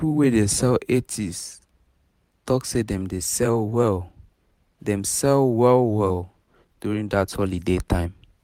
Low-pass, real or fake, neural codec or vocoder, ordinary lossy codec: 19.8 kHz; fake; vocoder, 44.1 kHz, 128 mel bands every 512 samples, BigVGAN v2; MP3, 96 kbps